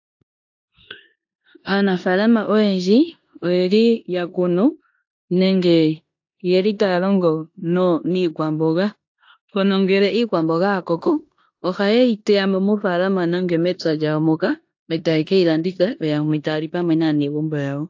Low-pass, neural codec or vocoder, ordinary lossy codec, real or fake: 7.2 kHz; codec, 16 kHz in and 24 kHz out, 0.9 kbps, LongCat-Audio-Codec, four codebook decoder; AAC, 48 kbps; fake